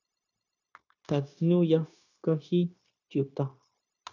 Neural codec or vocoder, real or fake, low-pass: codec, 16 kHz, 0.9 kbps, LongCat-Audio-Codec; fake; 7.2 kHz